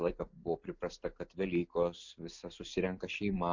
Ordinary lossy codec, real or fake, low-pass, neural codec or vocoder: MP3, 64 kbps; real; 7.2 kHz; none